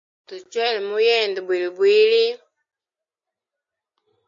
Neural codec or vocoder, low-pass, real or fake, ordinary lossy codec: none; 7.2 kHz; real; MP3, 48 kbps